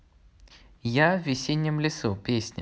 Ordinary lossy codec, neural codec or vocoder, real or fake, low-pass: none; none; real; none